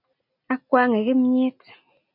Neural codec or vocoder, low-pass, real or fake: none; 5.4 kHz; real